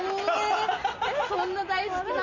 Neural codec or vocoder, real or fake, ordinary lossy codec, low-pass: none; real; none; 7.2 kHz